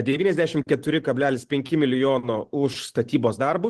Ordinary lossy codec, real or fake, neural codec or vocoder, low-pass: Opus, 16 kbps; real; none; 10.8 kHz